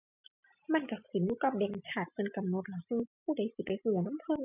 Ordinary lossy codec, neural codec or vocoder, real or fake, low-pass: none; none; real; 3.6 kHz